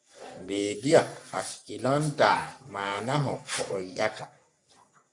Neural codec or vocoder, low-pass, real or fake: codec, 44.1 kHz, 3.4 kbps, Pupu-Codec; 10.8 kHz; fake